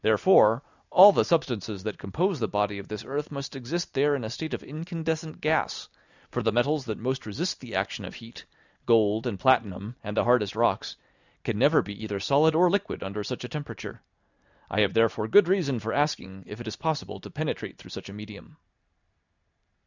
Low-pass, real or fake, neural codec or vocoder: 7.2 kHz; real; none